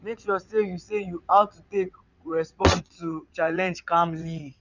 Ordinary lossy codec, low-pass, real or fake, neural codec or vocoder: none; 7.2 kHz; fake; vocoder, 22.05 kHz, 80 mel bands, WaveNeXt